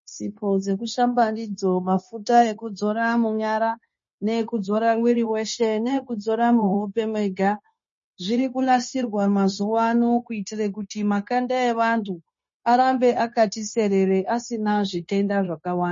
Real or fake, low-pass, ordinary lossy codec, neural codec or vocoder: fake; 7.2 kHz; MP3, 32 kbps; codec, 16 kHz, 0.9 kbps, LongCat-Audio-Codec